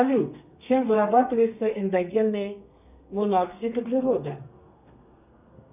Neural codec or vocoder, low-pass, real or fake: codec, 32 kHz, 1.9 kbps, SNAC; 3.6 kHz; fake